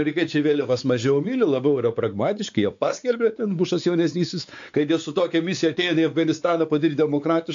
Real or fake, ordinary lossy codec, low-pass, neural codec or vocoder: fake; AAC, 64 kbps; 7.2 kHz; codec, 16 kHz, 4 kbps, X-Codec, WavLM features, trained on Multilingual LibriSpeech